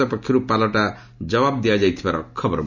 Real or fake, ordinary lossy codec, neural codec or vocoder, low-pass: real; none; none; 7.2 kHz